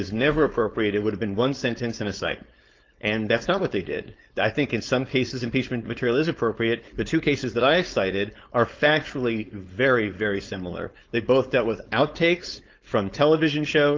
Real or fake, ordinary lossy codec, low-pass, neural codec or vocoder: fake; Opus, 24 kbps; 7.2 kHz; codec, 16 kHz, 4.8 kbps, FACodec